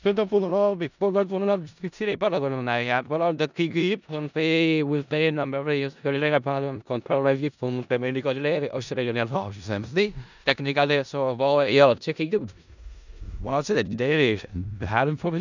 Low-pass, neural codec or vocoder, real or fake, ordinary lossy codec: 7.2 kHz; codec, 16 kHz in and 24 kHz out, 0.4 kbps, LongCat-Audio-Codec, four codebook decoder; fake; none